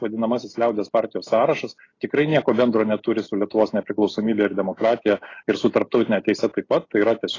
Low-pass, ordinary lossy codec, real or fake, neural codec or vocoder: 7.2 kHz; AAC, 32 kbps; real; none